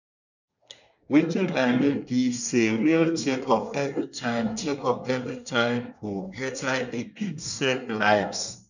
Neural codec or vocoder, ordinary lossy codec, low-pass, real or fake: codec, 24 kHz, 1 kbps, SNAC; none; 7.2 kHz; fake